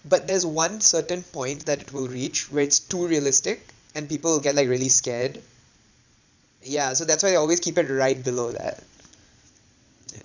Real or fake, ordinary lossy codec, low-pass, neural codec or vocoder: fake; none; 7.2 kHz; vocoder, 22.05 kHz, 80 mel bands, Vocos